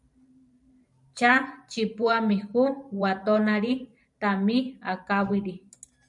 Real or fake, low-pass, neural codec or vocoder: fake; 10.8 kHz; vocoder, 44.1 kHz, 128 mel bands every 512 samples, BigVGAN v2